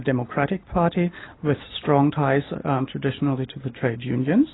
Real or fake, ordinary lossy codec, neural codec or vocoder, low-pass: real; AAC, 16 kbps; none; 7.2 kHz